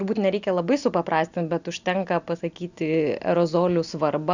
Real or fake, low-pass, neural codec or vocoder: real; 7.2 kHz; none